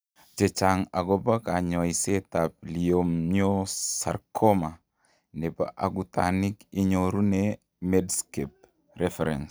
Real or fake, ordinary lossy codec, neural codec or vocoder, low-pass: real; none; none; none